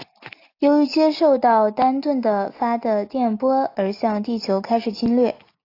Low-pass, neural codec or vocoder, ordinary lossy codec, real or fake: 5.4 kHz; none; AAC, 32 kbps; real